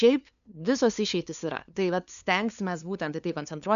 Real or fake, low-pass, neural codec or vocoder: fake; 7.2 kHz; codec, 16 kHz, 2 kbps, FunCodec, trained on LibriTTS, 25 frames a second